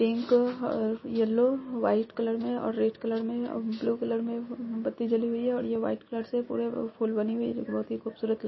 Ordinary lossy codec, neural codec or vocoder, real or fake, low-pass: MP3, 24 kbps; none; real; 7.2 kHz